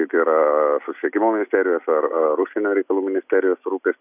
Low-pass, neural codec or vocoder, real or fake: 3.6 kHz; none; real